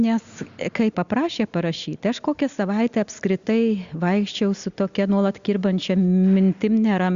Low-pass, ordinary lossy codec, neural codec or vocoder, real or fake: 7.2 kHz; Opus, 64 kbps; none; real